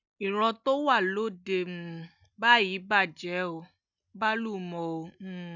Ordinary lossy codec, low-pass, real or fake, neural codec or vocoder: none; 7.2 kHz; real; none